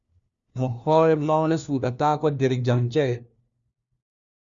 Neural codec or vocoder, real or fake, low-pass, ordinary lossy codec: codec, 16 kHz, 1 kbps, FunCodec, trained on LibriTTS, 50 frames a second; fake; 7.2 kHz; Opus, 64 kbps